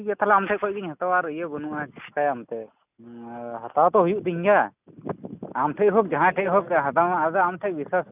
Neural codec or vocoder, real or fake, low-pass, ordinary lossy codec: none; real; 3.6 kHz; none